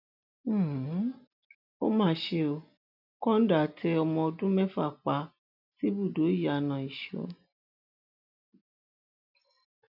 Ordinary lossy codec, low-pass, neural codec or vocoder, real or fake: none; 5.4 kHz; none; real